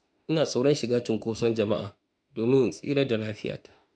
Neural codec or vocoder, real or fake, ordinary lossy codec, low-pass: autoencoder, 48 kHz, 32 numbers a frame, DAC-VAE, trained on Japanese speech; fake; none; 9.9 kHz